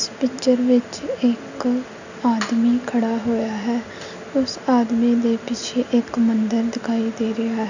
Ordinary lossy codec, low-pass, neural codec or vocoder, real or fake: none; 7.2 kHz; none; real